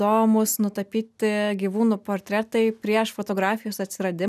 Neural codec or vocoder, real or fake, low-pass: none; real; 14.4 kHz